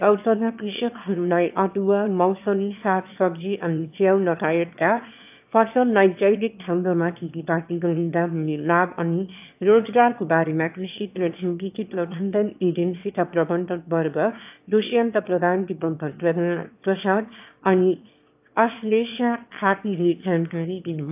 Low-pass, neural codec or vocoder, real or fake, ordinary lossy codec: 3.6 kHz; autoencoder, 22.05 kHz, a latent of 192 numbers a frame, VITS, trained on one speaker; fake; AAC, 32 kbps